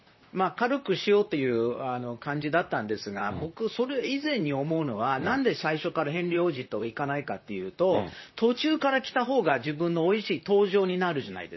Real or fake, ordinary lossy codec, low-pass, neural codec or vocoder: fake; MP3, 24 kbps; 7.2 kHz; vocoder, 44.1 kHz, 128 mel bands, Pupu-Vocoder